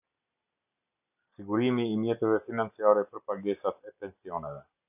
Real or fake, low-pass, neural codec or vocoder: real; 3.6 kHz; none